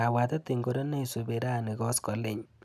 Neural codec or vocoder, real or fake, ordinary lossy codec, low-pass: none; real; none; 14.4 kHz